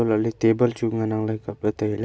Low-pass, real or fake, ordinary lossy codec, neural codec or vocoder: none; real; none; none